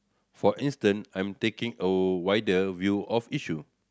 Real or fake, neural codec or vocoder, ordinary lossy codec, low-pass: real; none; none; none